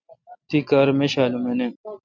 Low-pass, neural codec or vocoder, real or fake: 7.2 kHz; none; real